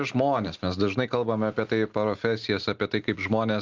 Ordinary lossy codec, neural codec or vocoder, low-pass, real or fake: Opus, 32 kbps; none; 7.2 kHz; real